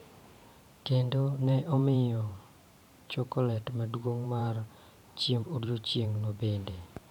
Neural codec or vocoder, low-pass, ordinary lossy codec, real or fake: vocoder, 44.1 kHz, 128 mel bands every 512 samples, BigVGAN v2; 19.8 kHz; none; fake